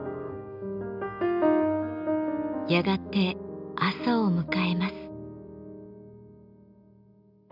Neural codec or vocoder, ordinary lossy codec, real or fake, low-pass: none; none; real; 5.4 kHz